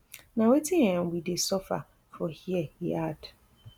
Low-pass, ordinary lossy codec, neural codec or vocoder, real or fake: none; none; none; real